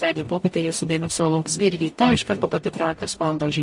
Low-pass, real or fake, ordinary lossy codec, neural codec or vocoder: 10.8 kHz; fake; MP3, 48 kbps; codec, 44.1 kHz, 0.9 kbps, DAC